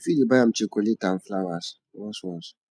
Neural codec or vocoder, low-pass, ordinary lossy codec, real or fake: none; none; none; real